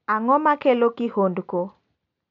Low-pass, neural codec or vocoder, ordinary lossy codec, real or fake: 7.2 kHz; none; none; real